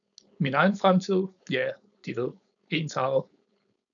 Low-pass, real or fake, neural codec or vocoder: 7.2 kHz; fake; codec, 16 kHz, 4.8 kbps, FACodec